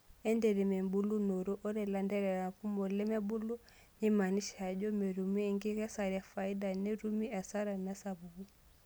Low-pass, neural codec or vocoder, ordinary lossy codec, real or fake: none; none; none; real